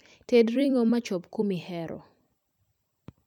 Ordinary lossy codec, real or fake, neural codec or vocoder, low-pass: none; fake; vocoder, 44.1 kHz, 128 mel bands every 256 samples, BigVGAN v2; 19.8 kHz